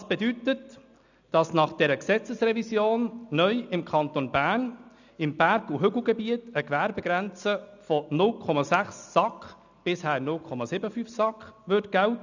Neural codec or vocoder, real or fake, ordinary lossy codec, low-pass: none; real; none; 7.2 kHz